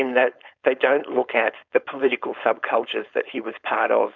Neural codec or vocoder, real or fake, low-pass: codec, 16 kHz, 4.8 kbps, FACodec; fake; 7.2 kHz